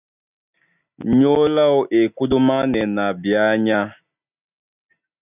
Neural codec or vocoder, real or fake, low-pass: none; real; 3.6 kHz